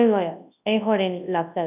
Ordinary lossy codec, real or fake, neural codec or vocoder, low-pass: none; fake; codec, 24 kHz, 0.9 kbps, WavTokenizer, large speech release; 3.6 kHz